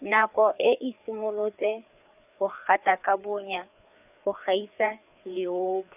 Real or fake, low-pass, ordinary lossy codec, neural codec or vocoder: fake; 3.6 kHz; none; codec, 16 kHz, 4 kbps, FreqCodec, larger model